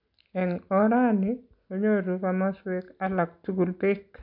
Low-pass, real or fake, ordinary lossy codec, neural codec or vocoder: 5.4 kHz; real; AAC, 48 kbps; none